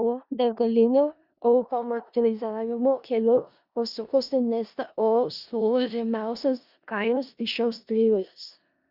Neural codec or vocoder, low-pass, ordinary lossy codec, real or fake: codec, 16 kHz in and 24 kHz out, 0.4 kbps, LongCat-Audio-Codec, four codebook decoder; 5.4 kHz; Opus, 64 kbps; fake